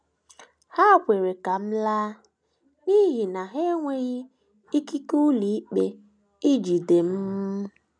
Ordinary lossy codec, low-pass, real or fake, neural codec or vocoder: none; 9.9 kHz; real; none